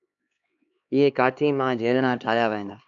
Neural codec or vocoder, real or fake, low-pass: codec, 16 kHz, 2 kbps, X-Codec, HuBERT features, trained on LibriSpeech; fake; 7.2 kHz